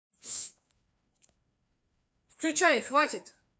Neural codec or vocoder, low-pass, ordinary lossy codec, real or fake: codec, 16 kHz, 2 kbps, FreqCodec, larger model; none; none; fake